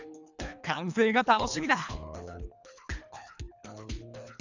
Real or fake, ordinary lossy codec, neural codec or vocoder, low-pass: fake; none; codec, 24 kHz, 3 kbps, HILCodec; 7.2 kHz